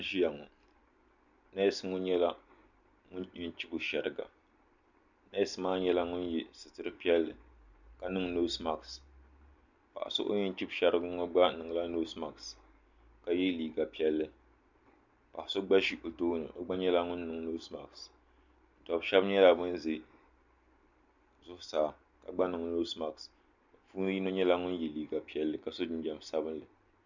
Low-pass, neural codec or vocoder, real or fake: 7.2 kHz; none; real